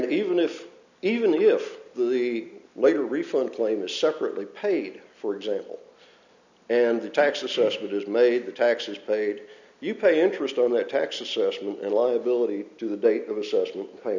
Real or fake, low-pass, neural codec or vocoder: real; 7.2 kHz; none